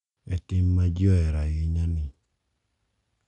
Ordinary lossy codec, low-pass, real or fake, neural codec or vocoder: none; 10.8 kHz; real; none